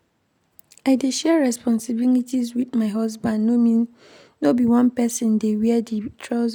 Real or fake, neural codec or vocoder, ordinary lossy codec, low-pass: real; none; none; 19.8 kHz